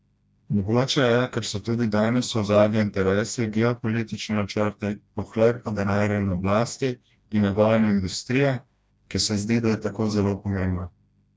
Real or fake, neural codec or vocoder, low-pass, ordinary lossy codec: fake; codec, 16 kHz, 1 kbps, FreqCodec, smaller model; none; none